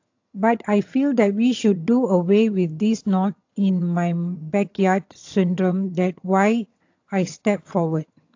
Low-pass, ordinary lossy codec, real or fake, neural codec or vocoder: 7.2 kHz; AAC, 48 kbps; fake; vocoder, 22.05 kHz, 80 mel bands, HiFi-GAN